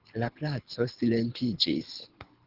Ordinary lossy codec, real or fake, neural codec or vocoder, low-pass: Opus, 16 kbps; fake; codec, 24 kHz, 6 kbps, HILCodec; 5.4 kHz